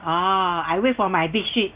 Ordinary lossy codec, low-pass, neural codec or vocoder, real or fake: Opus, 24 kbps; 3.6 kHz; none; real